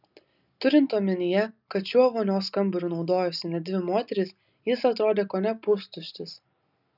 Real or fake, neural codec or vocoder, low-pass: real; none; 5.4 kHz